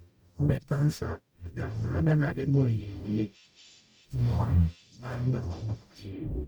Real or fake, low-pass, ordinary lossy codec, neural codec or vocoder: fake; 19.8 kHz; none; codec, 44.1 kHz, 0.9 kbps, DAC